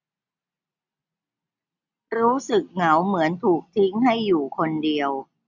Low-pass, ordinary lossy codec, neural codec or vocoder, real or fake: 7.2 kHz; none; none; real